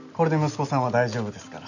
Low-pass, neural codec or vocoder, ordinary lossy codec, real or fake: 7.2 kHz; none; none; real